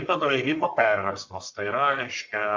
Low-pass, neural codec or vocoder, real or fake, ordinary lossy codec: 7.2 kHz; codec, 44.1 kHz, 1.7 kbps, Pupu-Codec; fake; MP3, 64 kbps